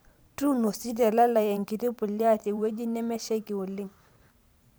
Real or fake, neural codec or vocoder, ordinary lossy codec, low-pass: fake; vocoder, 44.1 kHz, 128 mel bands every 512 samples, BigVGAN v2; none; none